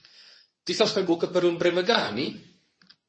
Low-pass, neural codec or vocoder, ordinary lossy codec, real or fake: 9.9 kHz; codec, 24 kHz, 0.9 kbps, WavTokenizer, medium speech release version 2; MP3, 32 kbps; fake